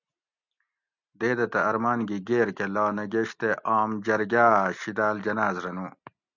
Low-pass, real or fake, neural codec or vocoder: 7.2 kHz; real; none